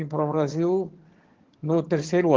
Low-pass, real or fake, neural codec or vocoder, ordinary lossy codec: 7.2 kHz; fake; vocoder, 22.05 kHz, 80 mel bands, HiFi-GAN; Opus, 16 kbps